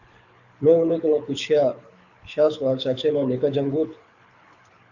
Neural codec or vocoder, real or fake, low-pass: codec, 24 kHz, 6 kbps, HILCodec; fake; 7.2 kHz